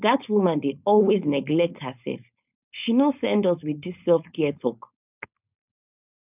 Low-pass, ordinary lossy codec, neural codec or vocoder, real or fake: 3.6 kHz; none; codec, 16 kHz, 4.8 kbps, FACodec; fake